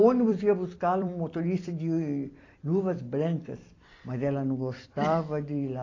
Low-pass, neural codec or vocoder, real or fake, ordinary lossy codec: 7.2 kHz; vocoder, 44.1 kHz, 128 mel bands every 256 samples, BigVGAN v2; fake; AAC, 32 kbps